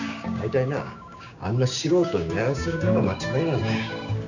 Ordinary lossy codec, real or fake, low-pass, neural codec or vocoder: none; fake; 7.2 kHz; codec, 16 kHz, 6 kbps, DAC